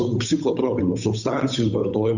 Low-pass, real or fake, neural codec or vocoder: 7.2 kHz; fake; codec, 16 kHz, 8 kbps, FunCodec, trained on Chinese and English, 25 frames a second